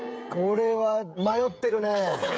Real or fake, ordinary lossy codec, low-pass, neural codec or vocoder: fake; none; none; codec, 16 kHz, 16 kbps, FreqCodec, smaller model